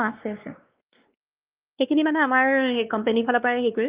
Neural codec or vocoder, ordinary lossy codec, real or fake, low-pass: codec, 16 kHz, 2 kbps, X-Codec, WavLM features, trained on Multilingual LibriSpeech; Opus, 24 kbps; fake; 3.6 kHz